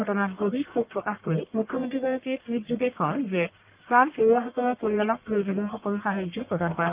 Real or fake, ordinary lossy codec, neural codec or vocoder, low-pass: fake; Opus, 24 kbps; codec, 44.1 kHz, 1.7 kbps, Pupu-Codec; 3.6 kHz